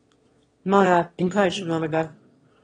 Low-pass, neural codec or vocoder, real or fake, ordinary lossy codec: 9.9 kHz; autoencoder, 22.05 kHz, a latent of 192 numbers a frame, VITS, trained on one speaker; fake; AAC, 32 kbps